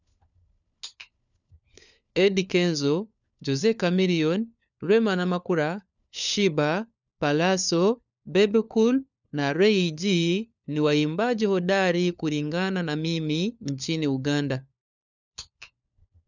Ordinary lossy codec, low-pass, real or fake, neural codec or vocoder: none; 7.2 kHz; fake; codec, 16 kHz, 4 kbps, FunCodec, trained on LibriTTS, 50 frames a second